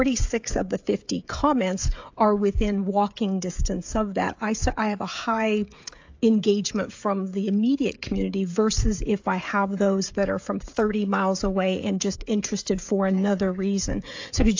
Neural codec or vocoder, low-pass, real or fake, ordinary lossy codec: codec, 16 kHz, 16 kbps, FreqCodec, smaller model; 7.2 kHz; fake; AAC, 48 kbps